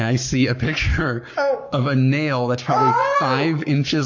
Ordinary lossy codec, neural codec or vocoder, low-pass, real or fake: MP3, 48 kbps; codec, 44.1 kHz, 7.8 kbps, Pupu-Codec; 7.2 kHz; fake